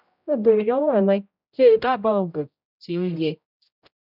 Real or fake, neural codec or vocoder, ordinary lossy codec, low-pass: fake; codec, 16 kHz, 0.5 kbps, X-Codec, HuBERT features, trained on general audio; none; 5.4 kHz